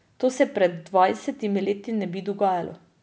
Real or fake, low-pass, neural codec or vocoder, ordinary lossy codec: real; none; none; none